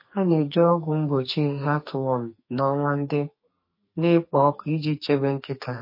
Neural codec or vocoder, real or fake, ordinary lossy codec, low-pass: codec, 44.1 kHz, 2.6 kbps, SNAC; fake; MP3, 24 kbps; 5.4 kHz